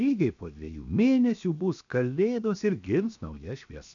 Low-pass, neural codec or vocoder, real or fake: 7.2 kHz; codec, 16 kHz, 0.7 kbps, FocalCodec; fake